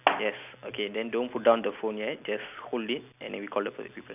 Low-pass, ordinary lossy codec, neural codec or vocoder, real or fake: 3.6 kHz; none; none; real